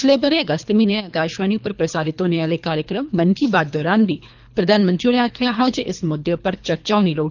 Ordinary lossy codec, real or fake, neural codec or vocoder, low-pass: none; fake; codec, 24 kHz, 3 kbps, HILCodec; 7.2 kHz